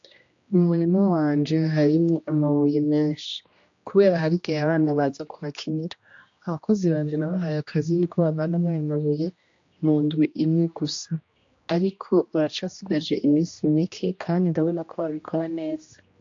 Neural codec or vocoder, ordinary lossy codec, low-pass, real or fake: codec, 16 kHz, 1 kbps, X-Codec, HuBERT features, trained on general audio; AAC, 48 kbps; 7.2 kHz; fake